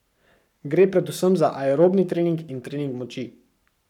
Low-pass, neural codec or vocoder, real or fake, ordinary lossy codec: 19.8 kHz; codec, 44.1 kHz, 7.8 kbps, Pupu-Codec; fake; none